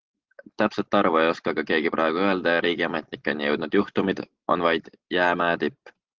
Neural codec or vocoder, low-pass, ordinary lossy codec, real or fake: none; 7.2 kHz; Opus, 16 kbps; real